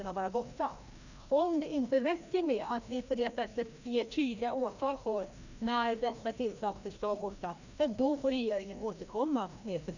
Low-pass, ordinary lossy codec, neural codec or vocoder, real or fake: 7.2 kHz; none; codec, 16 kHz, 1 kbps, FreqCodec, larger model; fake